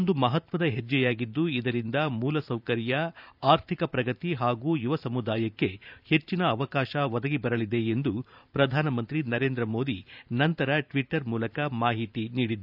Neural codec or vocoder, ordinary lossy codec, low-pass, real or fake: vocoder, 44.1 kHz, 128 mel bands every 512 samples, BigVGAN v2; none; 5.4 kHz; fake